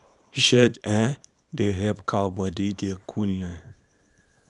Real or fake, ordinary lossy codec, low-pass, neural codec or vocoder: fake; none; 10.8 kHz; codec, 24 kHz, 0.9 kbps, WavTokenizer, small release